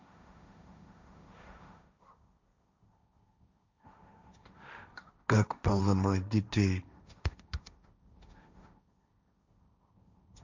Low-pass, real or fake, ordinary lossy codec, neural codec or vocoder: 7.2 kHz; fake; none; codec, 16 kHz, 1.1 kbps, Voila-Tokenizer